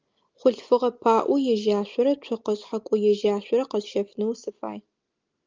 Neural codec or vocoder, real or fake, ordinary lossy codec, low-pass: none; real; Opus, 32 kbps; 7.2 kHz